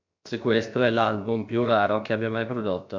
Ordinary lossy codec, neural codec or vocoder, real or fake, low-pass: MP3, 48 kbps; codec, 16 kHz, about 1 kbps, DyCAST, with the encoder's durations; fake; 7.2 kHz